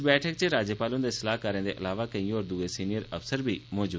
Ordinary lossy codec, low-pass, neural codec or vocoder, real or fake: none; none; none; real